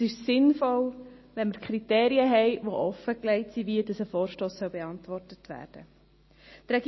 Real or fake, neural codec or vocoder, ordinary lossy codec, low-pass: real; none; MP3, 24 kbps; 7.2 kHz